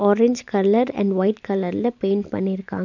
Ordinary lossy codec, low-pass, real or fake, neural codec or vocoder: none; 7.2 kHz; real; none